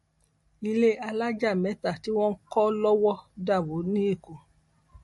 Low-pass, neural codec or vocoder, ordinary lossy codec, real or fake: 19.8 kHz; none; MP3, 48 kbps; real